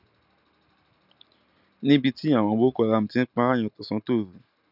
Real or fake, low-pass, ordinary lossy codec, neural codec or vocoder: real; 5.4 kHz; none; none